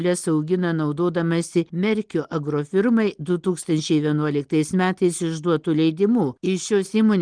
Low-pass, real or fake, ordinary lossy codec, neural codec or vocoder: 9.9 kHz; real; Opus, 24 kbps; none